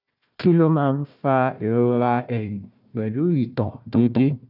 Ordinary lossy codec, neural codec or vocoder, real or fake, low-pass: none; codec, 16 kHz, 1 kbps, FunCodec, trained on Chinese and English, 50 frames a second; fake; 5.4 kHz